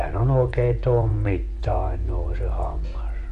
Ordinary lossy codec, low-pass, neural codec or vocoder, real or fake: AAC, 64 kbps; 10.8 kHz; vocoder, 24 kHz, 100 mel bands, Vocos; fake